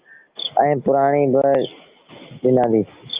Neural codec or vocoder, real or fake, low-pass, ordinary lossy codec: none; real; 3.6 kHz; Opus, 32 kbps